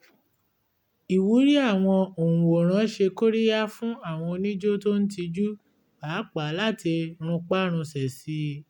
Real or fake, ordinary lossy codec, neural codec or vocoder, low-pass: real; MP3, 96 kbps; none; 19.8 kHz